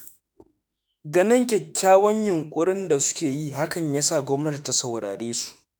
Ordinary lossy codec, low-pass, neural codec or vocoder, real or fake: none; none; autoencoder, 48 kHz, 32 numbers a frame, DAC-VAE, trained on Japanese speech; fake